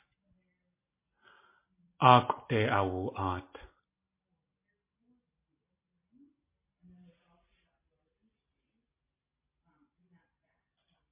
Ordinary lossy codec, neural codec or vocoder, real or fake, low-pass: MP3, 24 kbps; none; real; 3.6 kHz